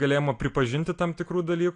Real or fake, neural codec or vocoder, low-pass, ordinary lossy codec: real; none; 9.9 kHz; AAC, 48 kbps